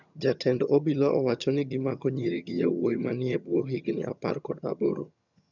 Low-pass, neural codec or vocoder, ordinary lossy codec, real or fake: 7.2 kHz; vocoder, 22.05 kHz, 80 mel bands, HiFi-GAN; none; fake